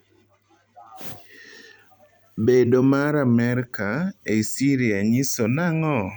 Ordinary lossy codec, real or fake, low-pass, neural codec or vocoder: none; fake; none; vocoder, 44.1 kHz, 128 mel bands every 256 samples, BigVGAN v2